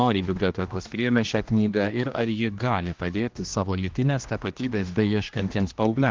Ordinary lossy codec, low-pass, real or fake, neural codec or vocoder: Opus, 32 kbps; 7.2 kHz; fake; codec, 16 kHz, 1 kbps, X-Codec, HuBERT features, trained on general audio